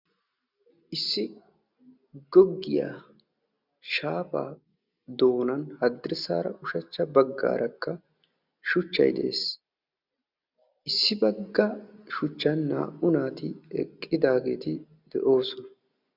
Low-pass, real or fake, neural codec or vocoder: 5.4 kHz; real; none